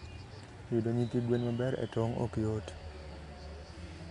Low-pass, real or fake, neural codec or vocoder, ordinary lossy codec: 10.8 kHz; real; none; none